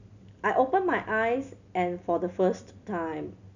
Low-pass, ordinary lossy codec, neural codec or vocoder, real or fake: 7.2 kHz; none; none; real